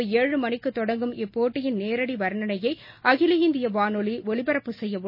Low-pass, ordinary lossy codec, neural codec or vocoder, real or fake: 5.4 kHz; MP3, 24 kbps; none; real